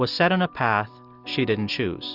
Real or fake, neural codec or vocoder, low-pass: real; none; 5.4 kHz